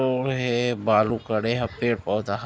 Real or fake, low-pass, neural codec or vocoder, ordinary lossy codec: real; none; none; none